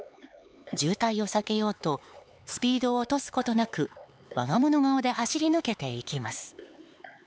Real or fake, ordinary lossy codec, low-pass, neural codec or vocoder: fake; none; none; codec, 16 kHz, 4 kbps, X-Codec, HuBERT features, trained on LibriSpeech